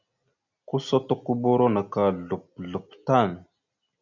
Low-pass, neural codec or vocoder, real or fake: 7.2 kHz; none; real